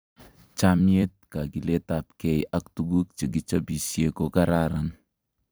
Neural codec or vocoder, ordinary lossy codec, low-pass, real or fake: vocoder, 44.1 kHz, 128 mel bands every 256 samples, BigVGAN v2; none; none; fake